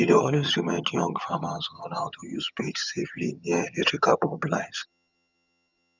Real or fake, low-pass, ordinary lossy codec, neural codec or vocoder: fake; 7.2 kHz; none; vocoder, 22.05 kHz, 80 mel bands, HiFi-GAN